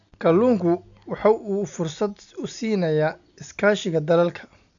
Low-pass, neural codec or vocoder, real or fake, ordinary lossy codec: 7.2 kHz; none; real; none